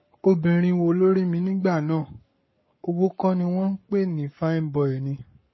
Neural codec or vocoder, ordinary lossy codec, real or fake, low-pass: codec, 44.1 kHz, 7.8 kbps, Pupu-Codec; MP3, 24 kbps; fake; 7.2 kHz